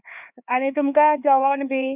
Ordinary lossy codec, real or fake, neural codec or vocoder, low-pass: none; fake; codec, 16 kHz, 4 kbps, X-Codec, HuBERT features, trained on LibriSpeech; 3.6 kHz